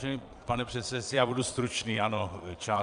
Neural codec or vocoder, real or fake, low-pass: vocoder, 22.05 kHz, 80 mel bands, Vocos; fake; 9.9 kHz